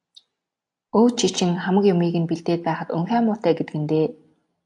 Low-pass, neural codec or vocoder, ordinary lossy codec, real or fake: 10.8 kHz; none; AAC, 64 kbps; real